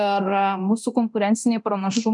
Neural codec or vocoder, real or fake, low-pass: codec, 24 kHz, 0.9 kbps, DualCodec; fake; 10.8 kHz